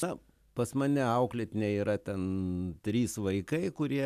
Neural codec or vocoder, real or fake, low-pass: none; real; 14.4 kHz